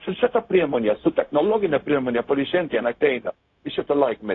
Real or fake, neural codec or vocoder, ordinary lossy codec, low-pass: fake; codec, 16 kHz, 0.4 kbps, LongCat-Audio-Codec; AAC, 32 kbps; 7.2 kHz